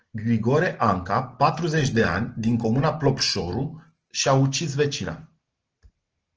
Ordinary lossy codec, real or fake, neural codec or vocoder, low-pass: Opus, 16 kbps; real; none; 7.2 kHz